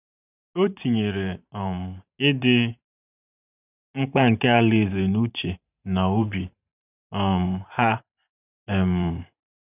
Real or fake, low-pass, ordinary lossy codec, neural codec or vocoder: fake; 3.6 kHz; none; vocoder, 44.1 kHz, 128 mel bands every 512 samples, BigVGAN v2